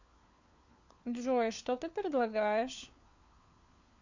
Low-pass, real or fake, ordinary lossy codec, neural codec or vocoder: 7.2 kHz; fake; Opus, 64 kbps; codec, 16 kHz, 4 kbps, FunCodec, trained on LibriTTS, 50 frames a second